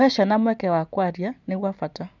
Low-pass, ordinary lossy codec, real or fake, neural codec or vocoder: 7.2 kHz; none; real; none